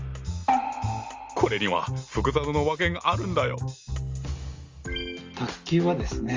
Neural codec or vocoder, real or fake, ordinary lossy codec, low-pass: none; real; Opus, 32 kbps; 7.2 kHz